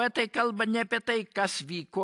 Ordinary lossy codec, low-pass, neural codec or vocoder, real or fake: AAC, 64 kbps; 10.8 kHz; none; real